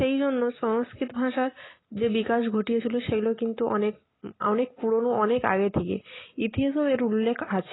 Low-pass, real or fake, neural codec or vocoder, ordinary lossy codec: 7.2 kHz; real; none; AAC, 16 kbps